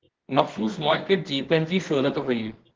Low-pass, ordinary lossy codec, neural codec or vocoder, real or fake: 7.2 kHz; Opus, 16 kbps; codec, 24 kHz, 0.9 kbps, WavTokenizer, medium music audio release; fake